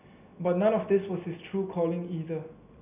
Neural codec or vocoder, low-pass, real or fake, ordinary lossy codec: none; 3.6 kHz; real; none